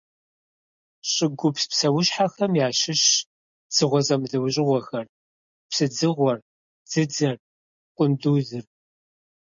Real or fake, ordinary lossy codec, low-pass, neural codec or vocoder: real; MP3, 64 kbps; 7.2 kHz; none